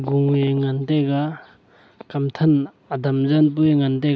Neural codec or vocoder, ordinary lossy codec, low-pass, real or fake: none; none; none; real